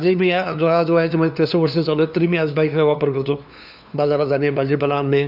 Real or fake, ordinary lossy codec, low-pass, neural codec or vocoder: fake; AAC, 48 kbps; 5.4 kHz; codec, 16 kHz, 2 kbps, FunCodec, trained on LibriTTS, 25 frames a second